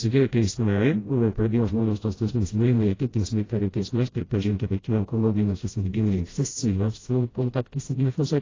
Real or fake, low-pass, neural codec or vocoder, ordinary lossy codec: fake; 7.2 kHz; codec, 16 kHz, 0.5 kbps, FreqCodec, smaller model; AAC, 32 kbps